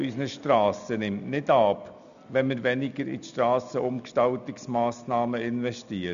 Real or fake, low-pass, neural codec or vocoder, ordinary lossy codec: real; 7.2 kHz; none; none